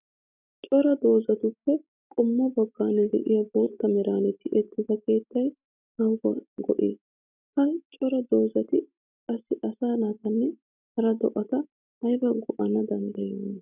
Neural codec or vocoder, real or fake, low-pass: none; real; 3.6 kHz